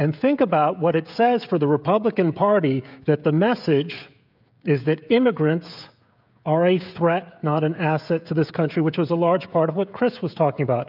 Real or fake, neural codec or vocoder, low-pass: fake; codec, 16 kHz, 16 kbps, FreqCodec, smaller model; 5.4 kHz